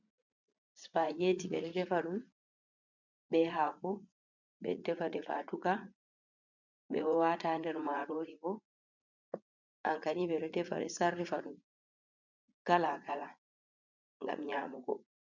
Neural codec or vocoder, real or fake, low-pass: vocoder, 44.1 kHz, 80 mel bands, Vocos; fake; 7.2 kHz